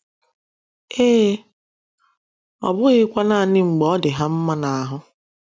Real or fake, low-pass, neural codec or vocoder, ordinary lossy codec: real; none; none; none